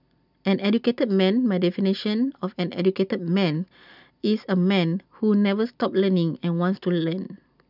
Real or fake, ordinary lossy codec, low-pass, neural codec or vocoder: real; none; 5.4 kHz; none